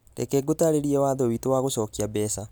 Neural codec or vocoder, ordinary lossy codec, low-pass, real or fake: none; none; none; real